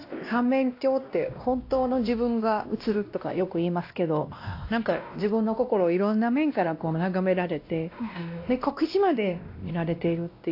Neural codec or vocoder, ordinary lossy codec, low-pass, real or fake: codec, 16 kHz, 1 kbps, X-Codec, WavLM features, trained on Multilingual LibriSpeech; AAC, 32 kbps; 5.4 kHz; fake